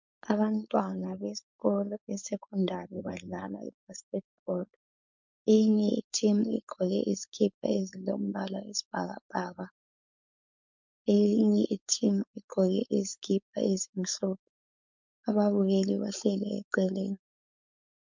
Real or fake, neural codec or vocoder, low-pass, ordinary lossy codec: fake; codec, 16 kHz, 4.8 kbps, FACodec; 7.2 kHz; MP3, 64 kbps